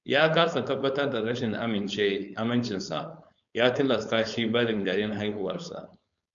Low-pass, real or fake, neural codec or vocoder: 7.2 kHz; fake; codec, 16 kHz, 4.8 kbps, FACodec